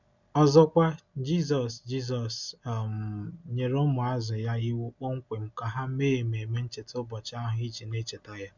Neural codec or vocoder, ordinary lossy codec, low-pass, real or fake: none; none; 7.2 kHz; real